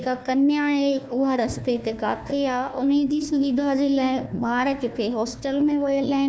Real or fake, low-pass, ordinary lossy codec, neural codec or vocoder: fake; none; none; codec, 16 kHz, 1 kbps, FunCodec, trained on Chinese and English, 50 frames a second